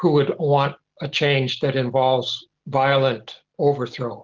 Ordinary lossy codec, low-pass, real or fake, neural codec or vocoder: Opus, 16 kbps; 7.2 kHz; real; none